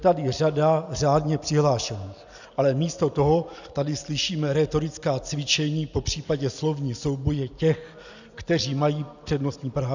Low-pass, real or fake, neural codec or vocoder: 7.2 kHz; real; none